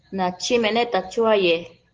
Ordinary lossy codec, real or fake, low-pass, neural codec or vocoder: Opus, 16 kbps; real; 7.2 kHz; none